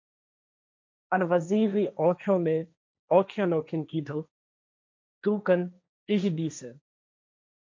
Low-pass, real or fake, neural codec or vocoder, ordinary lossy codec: 7.2 kHz; fake; codec, 16 kHz, 1.1 kbps, Voila-Tokenizer; MP3, 64 kbps